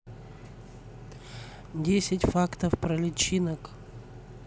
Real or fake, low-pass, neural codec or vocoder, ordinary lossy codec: real; none; none; none